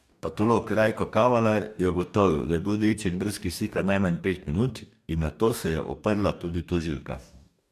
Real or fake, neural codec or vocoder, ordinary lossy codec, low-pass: fake; codec, 44.1 kHz, 2.6 kbps, DAC; MP3, 96 kbps; 14.4 kHz